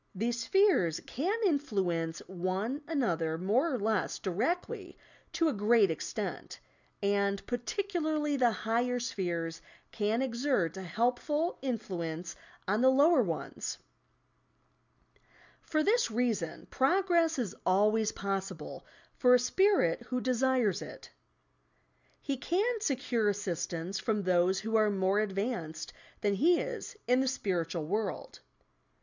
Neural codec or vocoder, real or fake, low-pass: none; real; 7.2 kHz